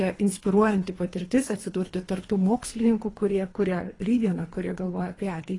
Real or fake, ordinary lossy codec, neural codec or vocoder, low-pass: fake; AAC, 32 kbps; codec, 24 kHz, 3 kbps, HILCodec; 10.8 kHz